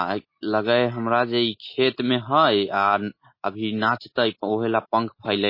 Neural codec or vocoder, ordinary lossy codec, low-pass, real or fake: none; MP3, 24 kbps; 5.4 kHz; real